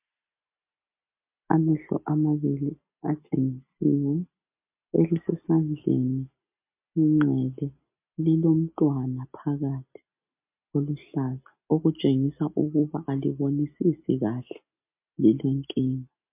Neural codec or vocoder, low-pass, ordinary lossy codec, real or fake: none; 3.6 kHz; MP3, 32 kbps; real